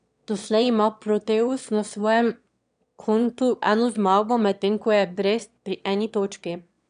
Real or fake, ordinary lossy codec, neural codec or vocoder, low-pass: fake; none; autoencoder, 22.05 kHz, a latent of 192 numbers a frame, VITS, trained on one speaker; 9.9 kHz